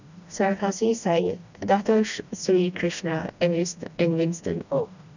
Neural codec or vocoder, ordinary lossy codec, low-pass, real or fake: codec, 16 kHz, 1 kbps, FreqCodec, smaller model; none; 7.2 kHz; fake